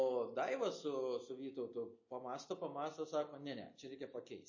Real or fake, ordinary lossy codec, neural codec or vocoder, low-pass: real; MP3, 32 kbps; none; 7.2 kHz